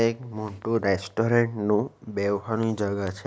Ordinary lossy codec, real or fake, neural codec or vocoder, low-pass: none; real; none; none